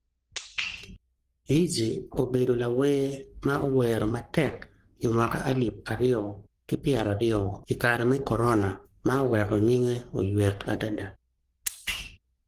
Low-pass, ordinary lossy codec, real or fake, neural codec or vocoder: 14.4 kHz; Opus, 24 kbps; fake; codec, 44.1 kHz, 3.4 kbps, Pupu-Codec